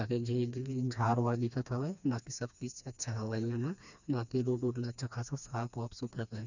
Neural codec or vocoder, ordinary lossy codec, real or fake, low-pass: codec, 16 kHz, 2 kbps, FreqCodec, smaller model; none; fake; 7.2 kHz